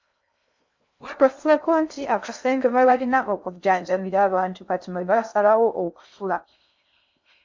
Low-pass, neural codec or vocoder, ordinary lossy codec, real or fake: 7.2 kHz; codec, 16 kHz in and 24 kHz out, 0.6 kbps, FocalCodec, streaming, 2048 codes; MP3, 64 kbps; fake